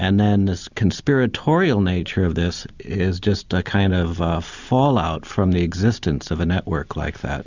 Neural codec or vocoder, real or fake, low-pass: none; real; 7.2 kHz